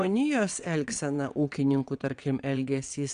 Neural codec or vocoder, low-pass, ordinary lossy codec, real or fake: vocoder, 22.05 kHz, 80 mel bands, WaveNeXt; 9.9 kHz; Opus, 64 kbps; fake